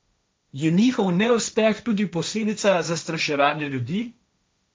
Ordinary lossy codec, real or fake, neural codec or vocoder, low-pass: none; fake; codec, 16 kHz, 1.1 kbps, Voila-Tokenizer; none